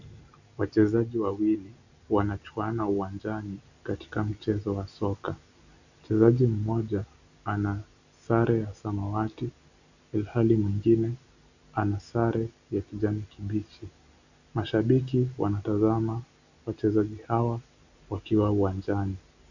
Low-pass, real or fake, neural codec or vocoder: 7.2 kHz; real; none